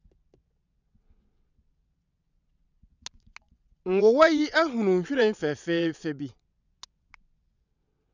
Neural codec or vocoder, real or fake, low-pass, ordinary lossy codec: vocoder, 22.05 kHz, 80 mel bands, WaveNeXt; fake; 7.2 kHz; none